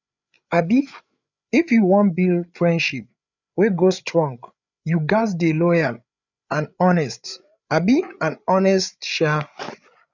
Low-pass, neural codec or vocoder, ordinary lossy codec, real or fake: 7.2 kHz; codec, 16 kHz, 8 kbps, FreqCodec, larger model; none; fake